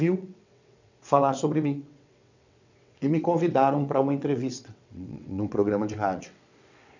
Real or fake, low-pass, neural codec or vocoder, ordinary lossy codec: fake; 7.2 kHz; vocoder, 22.05 kHz, 80 mel bands, WaveNeXt; none